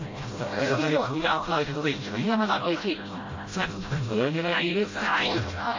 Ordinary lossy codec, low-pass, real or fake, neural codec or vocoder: MP3, 32 kbps; 7.2 kHz; fake; codec, 16 kHz, 0.5 kbps, FreqCodec, smaller model